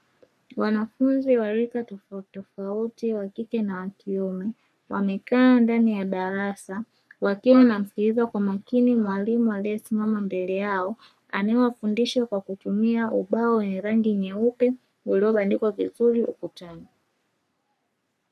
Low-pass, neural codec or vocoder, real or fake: 14.4 kHz; codec, 44.1 kHz, 3.4 kbps, Pupu-Codec; fake